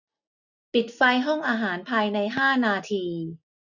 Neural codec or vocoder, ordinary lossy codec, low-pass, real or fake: none; none; 7.2 kHz; real